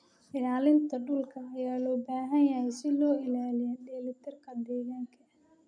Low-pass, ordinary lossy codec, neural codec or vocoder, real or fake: 9.9 kHz; none; none; real